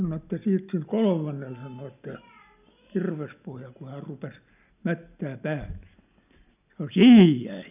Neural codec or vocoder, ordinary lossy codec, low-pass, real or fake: none; none; 3.6 kHz; real